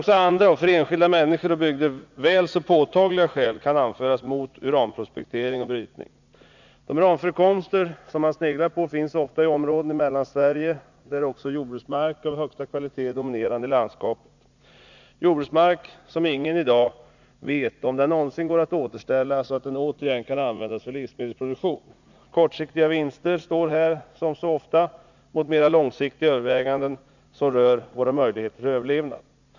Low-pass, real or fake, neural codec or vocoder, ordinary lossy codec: 7.2 kHz; fake; vocoder, 44.1 kHz, 80 mel bands, Vocos; none